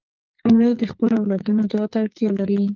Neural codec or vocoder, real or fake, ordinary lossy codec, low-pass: codec, 44.1 kHz, 2.6 kbps, SNAC; fake; Opus, 32 kbps; 7.2 kHz